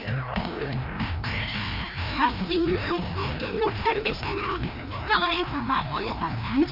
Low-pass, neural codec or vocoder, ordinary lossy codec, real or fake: 5.4 kHz; codec, 16 kHz, 1 kbps, FreqCodec, larger model; none; fake